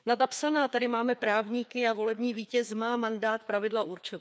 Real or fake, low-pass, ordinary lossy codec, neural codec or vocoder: fake; none; none; codec, 16 kHz, 2 kbps, FreqCodec, larger model